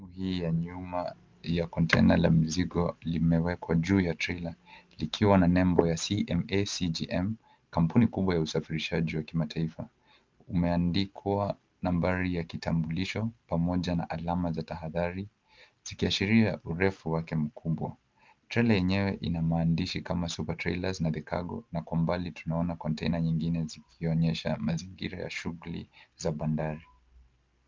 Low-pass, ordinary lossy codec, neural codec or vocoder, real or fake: 7.2 kHz; Opus, 32 kbps; none; real